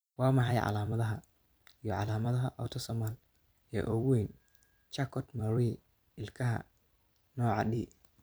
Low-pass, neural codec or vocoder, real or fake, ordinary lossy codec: none; vocoder, 44.1 kHz, 128 mel bands every 256 samples, BigVGAN v2; fake; none